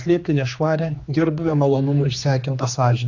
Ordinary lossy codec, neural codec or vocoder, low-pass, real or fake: AAC, 48 kbps; codec, 16 kHz, 2 kbps, X-Codec, HuBERT features, trained on general audio; 7.2 kHz; fake